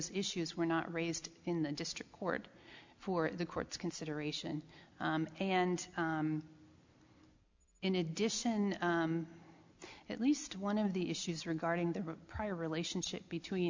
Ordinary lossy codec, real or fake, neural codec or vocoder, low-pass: MP3, 64 kbps; real; none; 7.2 kHz